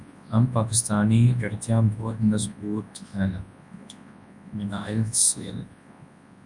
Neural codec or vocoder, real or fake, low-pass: codec, 24 kHz, 0.9 kbps, WavTokenizer, large speech release; fake; 10.8 kHz